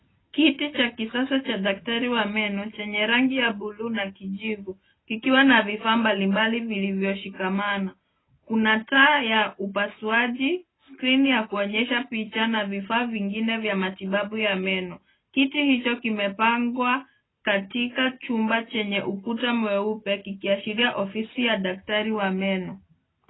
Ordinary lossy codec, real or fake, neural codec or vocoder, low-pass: AAC, 16 kbps; real; none; 7.2 kHz